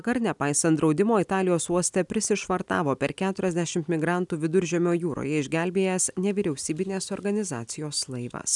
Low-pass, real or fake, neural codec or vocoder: 10.8 kHz; real; none